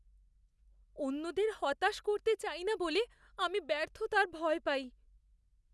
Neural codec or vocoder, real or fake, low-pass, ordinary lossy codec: none; real; none; none